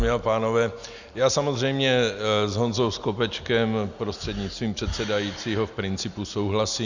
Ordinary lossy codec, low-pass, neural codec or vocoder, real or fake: Opus, 64 kbps; 7.2 kHz; none; real